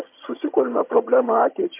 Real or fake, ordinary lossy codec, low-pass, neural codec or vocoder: fake; MP3, 32 kbps; 3.6 kHz; vocoder, 22.05 kHz, 80 mel bands, HiFi-GAN